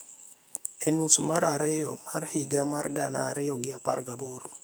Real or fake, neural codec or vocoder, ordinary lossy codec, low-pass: fake; codec, 44.1 kHz, 2.6 kbps, SNAC; none; none